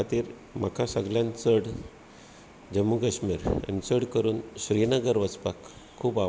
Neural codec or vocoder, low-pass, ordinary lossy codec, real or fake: none; none; none; real